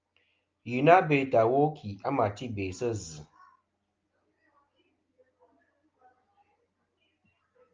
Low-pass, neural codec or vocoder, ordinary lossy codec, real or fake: 7.2 kHz; none; Opus, 24 kbps; real